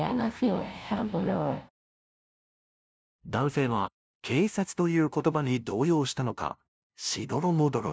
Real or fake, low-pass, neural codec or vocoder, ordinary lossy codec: fake; none; codec, 16 kHz, 0.5 kbps, FunCodec, trained on LibriTTS, 25 frames a second; none